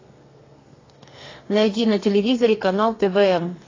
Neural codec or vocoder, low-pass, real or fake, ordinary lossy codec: codec, 32 kHz, 1.9 kbps, SNAC; 7.2 kHz; fake; AAC, 32 kbps